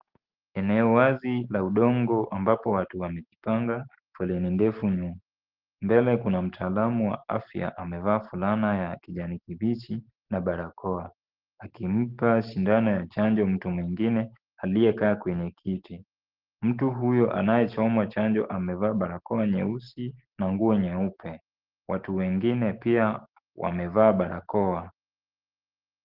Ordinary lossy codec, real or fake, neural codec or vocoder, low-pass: Opus, 16 kbps; real; none; 5.4 kHz